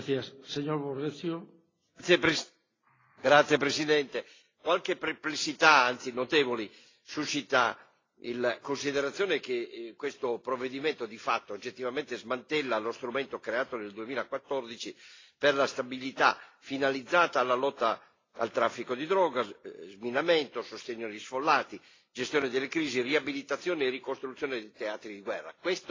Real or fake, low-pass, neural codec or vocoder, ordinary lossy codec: real; 7.2 kHz; none; AAC, 32 kbps